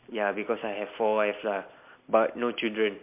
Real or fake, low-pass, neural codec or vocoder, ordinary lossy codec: real; 3.6 kHz; none; MP3, 32 kbps